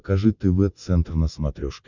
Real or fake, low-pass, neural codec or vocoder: real; 7.2 kHz; none